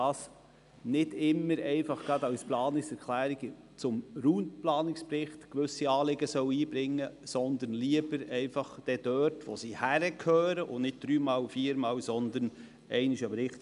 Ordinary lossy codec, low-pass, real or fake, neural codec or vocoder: none; 10.8 kHz; real; none